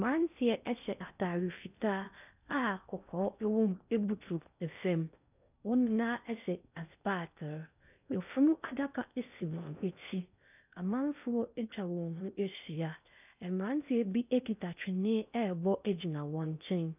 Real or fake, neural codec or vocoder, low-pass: fake; codec, 16 kHz in and 24 kHz out, 0.6 kbps, FocalCodec, streaming, 4096 codes; 3.6 kHz